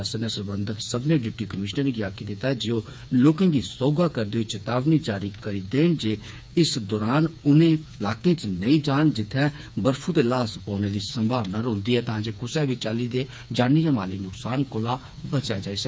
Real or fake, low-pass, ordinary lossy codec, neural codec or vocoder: fake; none; none; codec, 16 kHz, 4 kbps, FreqCodec, smaller model